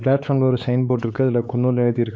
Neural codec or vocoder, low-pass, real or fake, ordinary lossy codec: codec, 16 kHz, 4 kbps, X-Codec, WavLM features, trained on Multilingual LibriSpeech; none; fake; none